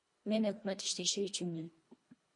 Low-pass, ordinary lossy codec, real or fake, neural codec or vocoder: 10.8 kHz; MP3, 48 kbps; fake; codec, 24 kHz, 1.5 kbps, HILCodec